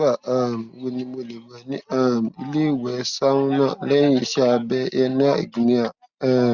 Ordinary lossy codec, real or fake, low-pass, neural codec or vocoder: Opus, 64 kbps; real; 7.2 kHz; none